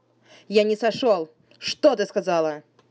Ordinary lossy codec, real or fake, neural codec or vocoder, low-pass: none; real; none; none